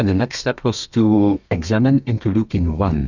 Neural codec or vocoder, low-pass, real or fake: codec, 32 kHz, 1.9 kbps, SNAC; 7.2 kHz; fake